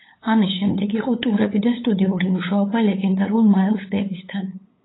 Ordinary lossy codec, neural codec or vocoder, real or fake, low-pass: AAC, 16 kbps; codec, 16 kHz, 8 kbps, FunCodec, trained on LibriTTS, 25 frames a second; fake; 7.2 kHz